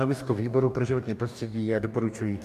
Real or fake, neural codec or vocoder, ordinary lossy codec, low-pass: fake; codec, 44.1 kHz, 2.6 kbps, DAC; MP3, 96 kbps; 14.4 kHz